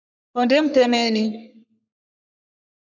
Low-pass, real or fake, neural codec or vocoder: 7.2 kHz; fake; codec, 44.1 kHz, 3.4 kbps, Pupu-Codec